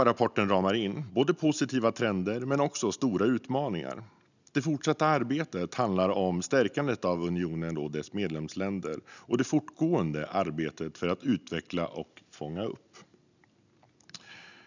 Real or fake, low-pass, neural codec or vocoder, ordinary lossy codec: real; 7.2 kHz; none; none